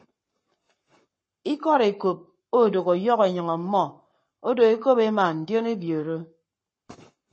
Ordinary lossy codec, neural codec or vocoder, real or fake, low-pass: MP3, 32 kbps; codec, 44.1 kHz, 7.8 kbps, Pupu-Codec; fake; 10.8 kHz